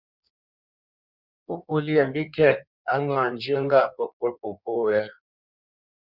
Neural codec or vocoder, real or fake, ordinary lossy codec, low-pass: codec, 16 kHz in and 24 kHz out, 1.1 kbps, FireRedTTS-2 codec; fake; Opus, 64 kbps; 5.4 kHz